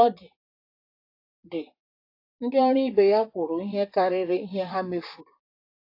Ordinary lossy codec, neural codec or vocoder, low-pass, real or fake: AAC, 32 kbps; vocoder, 44.1 kHz, 128 mel bands, Pupu-Vocoder; 5.4 kHz; fake